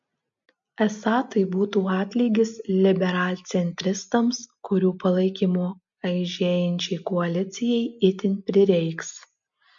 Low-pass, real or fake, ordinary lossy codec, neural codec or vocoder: 7.2 kHz; real; MP3, 48 kbps; none